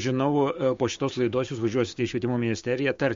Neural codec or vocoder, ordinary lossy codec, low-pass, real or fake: codec, 16 kHz, 6 kbps, DAC; MP3, 48 kbps; 7.2 kHz; fake